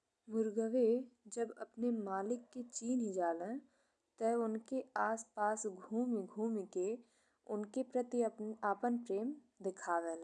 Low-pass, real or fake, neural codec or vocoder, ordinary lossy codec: 10.8 kHz; real; none; none